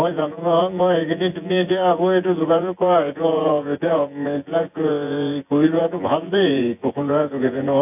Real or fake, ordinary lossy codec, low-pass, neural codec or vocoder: fake; AAC, 32 kbps; 3.6 kHz; vocoder, 24 kHz, 100 mel bands, Vocos